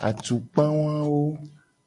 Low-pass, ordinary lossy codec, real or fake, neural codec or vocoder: 10.8 kHz; MP3, 64 kbps; real; none